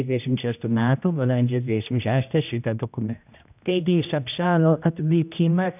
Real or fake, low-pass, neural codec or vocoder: fake; 3.6 kHz; codec, 16 kHz, 1 kbps, X-Codec, HuBERT features, trained on general audio